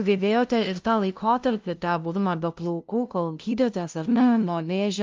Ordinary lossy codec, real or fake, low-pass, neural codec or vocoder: Opus, 32 kbps; fake; 7.2 kHz; codec, 16 kHz, 0.5 kbps, FunCodec, trained on LibriTTS, 25 frames a second